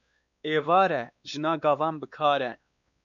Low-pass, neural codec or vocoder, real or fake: 7.2 kHz; codec, 16 kHz, 2 kbps, X-Codec, WavLM features, trained on Multilingual LibriSpeech; fake